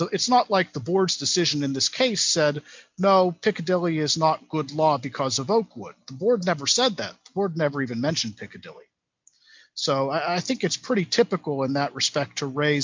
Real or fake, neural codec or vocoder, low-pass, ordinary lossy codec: real; none; 7.2 kHz; MP3, 64 kbps